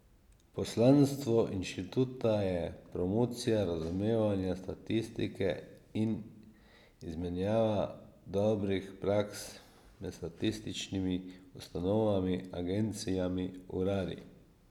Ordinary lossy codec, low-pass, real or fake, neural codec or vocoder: none; 19.8 kHz; real; none